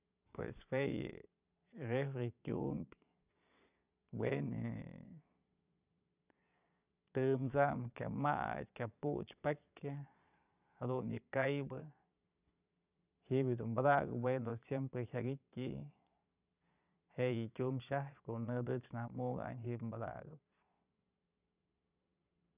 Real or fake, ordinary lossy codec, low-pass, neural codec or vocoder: fake; none; 3.6 kHz; vocoder, 22.05 kHz, 80 mel bands, Vocos